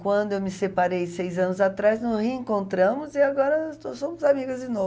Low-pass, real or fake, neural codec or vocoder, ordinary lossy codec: none; real; none; none